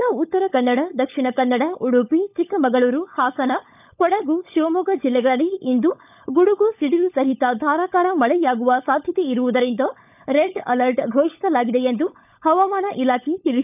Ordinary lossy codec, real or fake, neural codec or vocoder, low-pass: none; fake; codec, 16 kHz, 16 kbps, FunCodec, trained on LibriTTS, 50 frames a second; 3.6 kHz